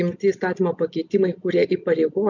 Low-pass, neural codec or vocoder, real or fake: 7.2 kHz; none; real